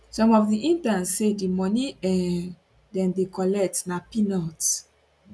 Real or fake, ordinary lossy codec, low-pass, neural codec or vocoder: real; none; none; none